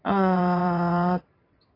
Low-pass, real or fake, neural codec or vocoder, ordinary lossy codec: 5.4 kHz; fake; vocoder, 22.05 kHz, 80 mel bands, WaveNeXt; AAC, 24 kbps